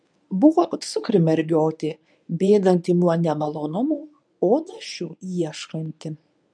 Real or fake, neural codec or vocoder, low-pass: fake; codec, 24 kHz, 0.9 kbps, WavTokenizer, medium speech release version 1; 9.9 kHz